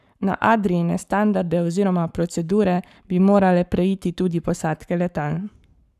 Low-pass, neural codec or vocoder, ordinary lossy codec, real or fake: 14.4 kHz; codec, 44.1 kHz, 7.8 kbps, Pupu-Codec; none; fake